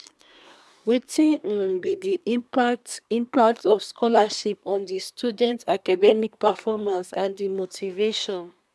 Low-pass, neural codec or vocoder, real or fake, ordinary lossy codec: none; codec, 24 kHz, 1 kbps, SNAC; fake; none